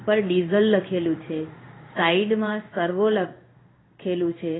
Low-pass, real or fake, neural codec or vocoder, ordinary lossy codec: 7.2 kHz; fake; codec, 16 kHz in and 24 kHz out, 1 kbps, XY-Tokenizer; AAC, 16 kbps